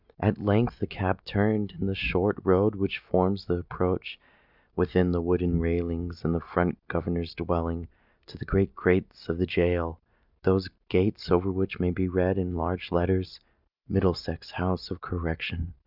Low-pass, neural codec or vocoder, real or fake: 5.4 kHz; none; real